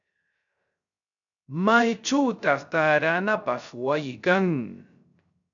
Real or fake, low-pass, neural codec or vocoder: fake; 7.2 kHz; codec, 16 kHz, 0.3 kbps, FocalCodec